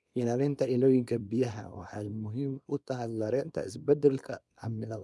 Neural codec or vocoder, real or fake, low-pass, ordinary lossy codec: codec, 24 kHz, 0.9 kbps, WavTokenizer, small release; fake; none; none